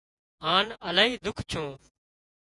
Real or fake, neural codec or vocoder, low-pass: fake; vocoder, 48 kHz, 128 mel bands, Vocos; 10.8 kHz